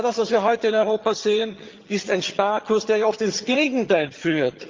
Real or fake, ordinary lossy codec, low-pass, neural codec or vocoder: fake; Opus, 24 kbps; 7.2 kHz; vocoder, 22.05 kHz, 80 mel bands, HiFi-GAN